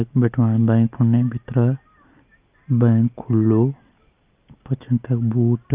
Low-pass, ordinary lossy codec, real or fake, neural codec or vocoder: 3.6 kHz; Opus, 24 kbps; real; none